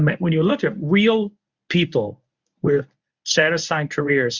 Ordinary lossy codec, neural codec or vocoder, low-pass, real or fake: Opus, 64 kbps; codec, 24 kHz, 0.9 kbps, WavTokenizer, medium speech release version 1; 7.2 kHz; fake